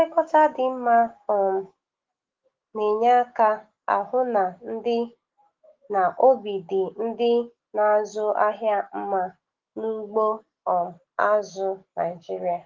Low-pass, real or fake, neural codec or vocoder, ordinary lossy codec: 7.2 kHz; fake; autoencoder, 48 kHz, 128 numbers a frame, DAC-VAE, trained on Japanese speech; Opus, 16 kbps